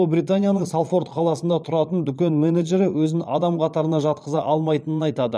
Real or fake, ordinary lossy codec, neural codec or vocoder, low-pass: fake; none; vocoder, 22.05 kHz, 80 mel bands, Vocos; none